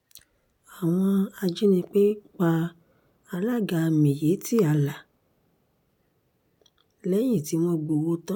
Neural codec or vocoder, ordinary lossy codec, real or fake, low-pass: none; none; real; 19.8 kHz